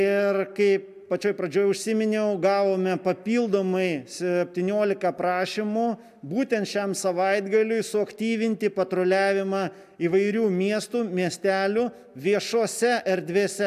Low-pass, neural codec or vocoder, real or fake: 14.4 kHz; none; real